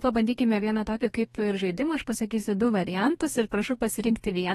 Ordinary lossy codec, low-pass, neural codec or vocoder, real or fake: AAC, 32 kbps; 14.4 kHz; codec, 32 kHz, 1.9 kbps, SNAC; fake